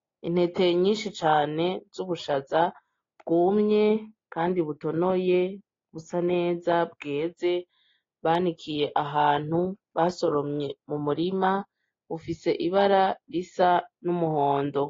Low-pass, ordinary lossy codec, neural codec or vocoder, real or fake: 7.2 kHz; AAC, 32 kbps; none; real